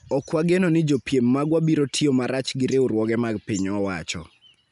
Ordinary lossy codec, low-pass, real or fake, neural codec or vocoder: none; 10.8 kHz; real; none